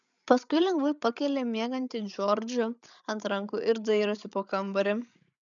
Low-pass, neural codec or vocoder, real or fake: 7.2 kHz; codec, 16 kHz, 8 kbps, FreqCodec, larger model; fake